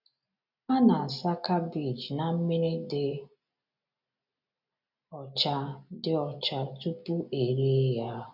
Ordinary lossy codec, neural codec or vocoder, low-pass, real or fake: none; none; 5.4 kHz; real